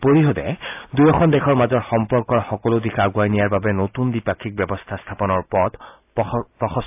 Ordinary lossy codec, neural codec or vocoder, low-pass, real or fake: AAC, 32 kbps; none; 3.6 kHz; real